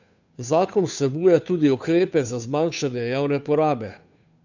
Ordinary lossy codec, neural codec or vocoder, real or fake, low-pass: none; codec, 16 kHz, 2 kbps, FunCodec, trained on Chinese and English, 25 frames a second; fake; 7.2 kHz